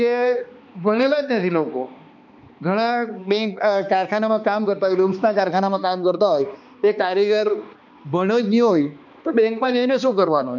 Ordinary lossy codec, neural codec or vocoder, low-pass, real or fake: none; codec, 16 kHz, 2 kbps, X-Codec, HuBERT features, trained on balanced general audio; 7.2 kHz; fake